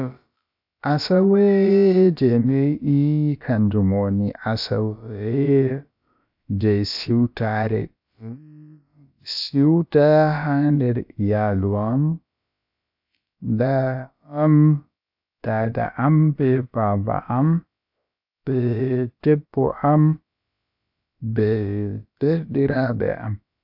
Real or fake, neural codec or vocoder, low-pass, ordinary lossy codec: fake; codec, 16 kHz, about 1 kbps, DyCAST, with the encoder's durations; 5.4 kHz; AAC, 48 kbps